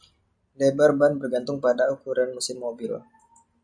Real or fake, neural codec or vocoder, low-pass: real; none; 9.9 kHz